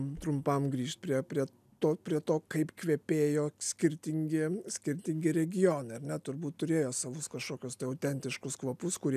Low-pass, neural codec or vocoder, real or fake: 14.4 kHz; none; real